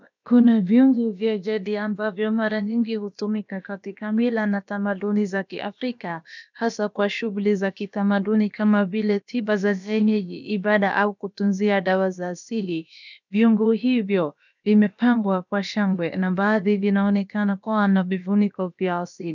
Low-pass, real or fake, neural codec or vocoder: 7.2 kHz; fake; codec, 16 kHz, about 1 kbps, DyCAST, with the encoder's durations